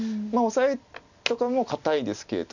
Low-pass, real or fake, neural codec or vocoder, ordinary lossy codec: 7.2 kHz; real; none; none